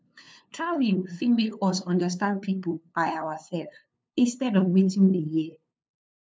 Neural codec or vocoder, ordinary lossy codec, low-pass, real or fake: codec, 16 kHz, 2 kbps, FunCodec, trained on LibriTTS, 25 frames a second; none; none; fake